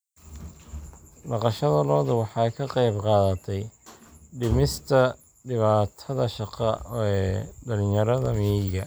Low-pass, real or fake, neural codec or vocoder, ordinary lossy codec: none; real; none; none